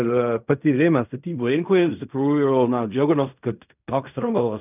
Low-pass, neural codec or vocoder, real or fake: 3.6 kHz; codec, 16 kHz in and 24 kHz out, 0.4 kbps, LongCat-Audio-Codec, fine tuned four codebook decoder; fake